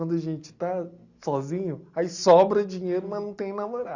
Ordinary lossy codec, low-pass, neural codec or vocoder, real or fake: Opus, 64 kbps; 7.2 kHz; none; real